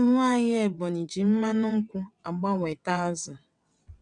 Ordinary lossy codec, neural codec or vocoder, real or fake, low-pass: none; vocoder, 22.05 kHz, 80 mel bands, WaveNeXt; fake; 9.9 kHz